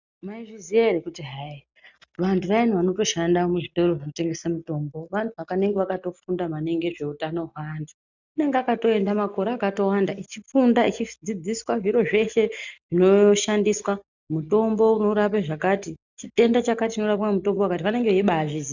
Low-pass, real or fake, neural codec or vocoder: 7.2 kHz; real; none